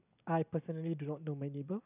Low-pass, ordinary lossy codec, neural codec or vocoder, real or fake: 3.6 kHz; none; none; real